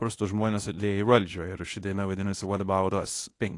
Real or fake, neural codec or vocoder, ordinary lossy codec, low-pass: fake; codec, 24 kHz, 0.9 kbps, WavTokenizer, medium speech release version 1; AAC, 48 kbps; 10.8 kHz